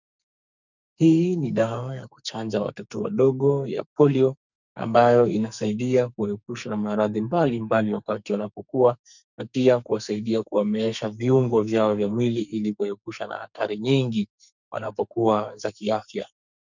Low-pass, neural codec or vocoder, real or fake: 7.2 kHz; codec, 44.1 kHz, 2.6 kbps, SNAC; fake